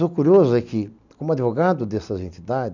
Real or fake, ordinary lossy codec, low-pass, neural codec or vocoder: real; none; 7.2 kHz; none